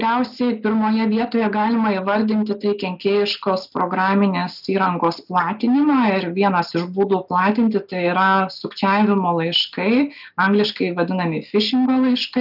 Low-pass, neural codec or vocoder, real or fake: 5.4 kHz; none; real